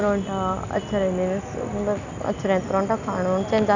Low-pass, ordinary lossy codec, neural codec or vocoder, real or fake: 7.2 kHz; none; none; real